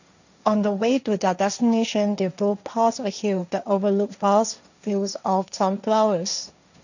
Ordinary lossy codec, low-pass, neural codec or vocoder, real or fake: none; 7.2 kHz; codec, 16 kHz, 1.1 kbps, Voila-Tokenizer; fake